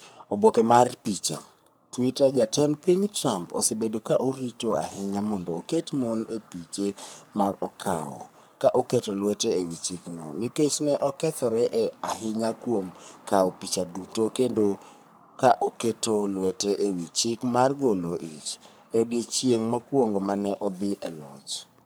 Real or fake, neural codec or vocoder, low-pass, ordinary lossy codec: fake; codec, 44.1 kHz, 3.4 kbps, Pupu-Codec; none; none